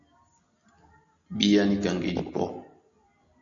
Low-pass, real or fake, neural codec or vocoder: 7.2 kHz; real; none